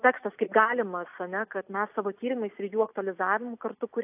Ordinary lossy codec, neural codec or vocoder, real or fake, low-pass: AAC, 32 kbps; none; real; 3.6 kHz